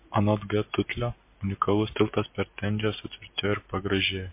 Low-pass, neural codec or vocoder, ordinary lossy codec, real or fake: 3.6 kHz; none; MP3, 24 kbps; real